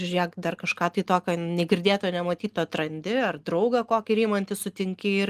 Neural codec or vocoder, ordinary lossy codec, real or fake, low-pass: none; Opus, 24 kbps; real; 14.4 kHz